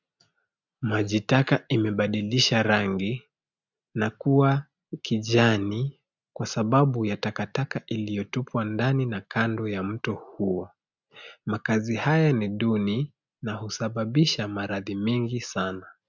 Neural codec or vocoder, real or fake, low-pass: none; real; 7.2 kHz